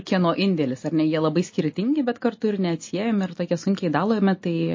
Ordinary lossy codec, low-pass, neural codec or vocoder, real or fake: MP3, 32 kbps; 7.2 kHz; none; real